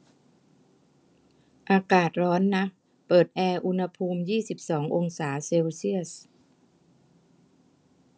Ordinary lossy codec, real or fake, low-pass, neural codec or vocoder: none; real; none; none